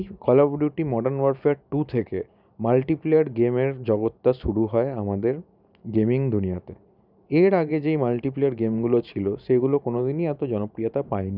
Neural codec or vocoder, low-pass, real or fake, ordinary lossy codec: vocoder, 44.1 kHz, 128 mel bands every 512 samples, BigVGAN v2; 5.4 kHz; fake; none